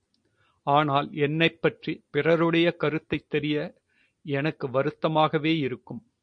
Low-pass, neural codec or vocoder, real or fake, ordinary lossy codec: 9.9 kHz; none; real; MP3, 48 kbps